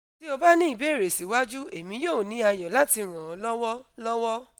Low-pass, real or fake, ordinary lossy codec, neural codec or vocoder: none; real; none; none